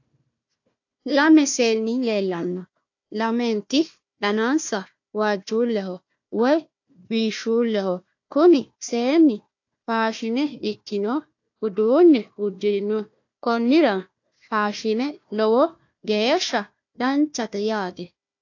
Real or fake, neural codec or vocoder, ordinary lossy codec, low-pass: fake; codec, 16 kHz, 1 kbps, FunCodec, trained on Chinese and English, 50 frames a second; AAC, 48 kbps; 7.2 kHz